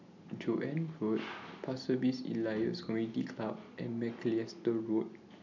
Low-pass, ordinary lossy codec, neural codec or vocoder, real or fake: 7.2 kHz; none; none; real